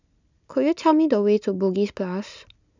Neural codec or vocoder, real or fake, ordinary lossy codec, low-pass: none; real; none; 7.2 kHz